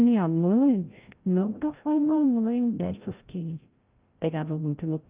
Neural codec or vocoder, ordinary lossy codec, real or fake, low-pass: codec, 16 kHz, 0.5 kbps, FreqCodec, larger model; Opus, 24 kbps; fake; 3.6 kHz